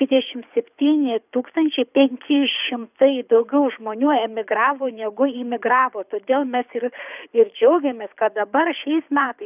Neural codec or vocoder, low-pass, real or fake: codec, 24 kHz, 6 kbps, HILCodec; 3.6 kHz; fake